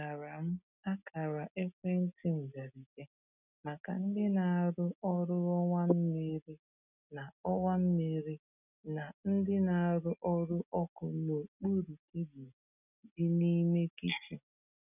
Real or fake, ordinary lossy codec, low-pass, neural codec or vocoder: real; none; 3.6 kHz; none